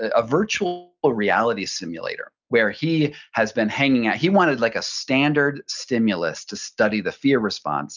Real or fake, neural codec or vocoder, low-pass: real; none; 7.2 kHz